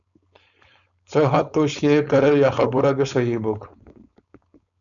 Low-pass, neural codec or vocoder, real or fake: 7.2 kHz; codec, 16 kHz, 4.8 kbps, FACodec; fake